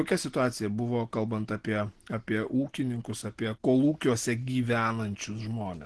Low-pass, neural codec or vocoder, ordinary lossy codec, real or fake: 10.8 kHz; none; Opus, 16 kbps; real